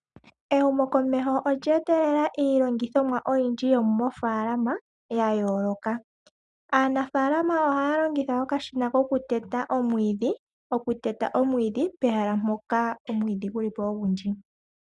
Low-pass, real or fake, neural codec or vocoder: 10.8 kHz; real; none